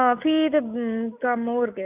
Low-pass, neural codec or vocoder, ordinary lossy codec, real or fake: 3.6 kHz; none; none; real